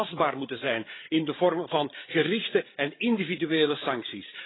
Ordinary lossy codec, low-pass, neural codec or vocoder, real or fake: AAC, 16 kbps; 7.2 kHz; codec, 16 kHz, 16 kbps, FreqCodec, larger model; fake